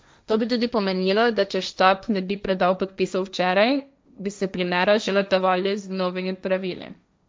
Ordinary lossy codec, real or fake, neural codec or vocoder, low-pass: none; fake; codec, 16 kHz, 1.1 kbps, Voila-Tokenizer; none